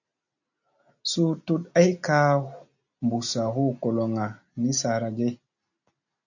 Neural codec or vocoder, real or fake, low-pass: none; real; 7.2 kHz